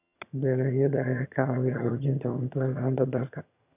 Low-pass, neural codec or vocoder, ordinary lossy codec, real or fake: 3.6 kHz; vocoder, 22.05 kHz, 80 mel bands, HiFi-GAN; none; fake